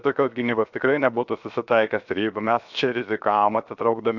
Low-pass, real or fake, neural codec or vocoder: 7.2 kHz; fake; codec, 16 kHz, 0.7 kbps, FocalCodec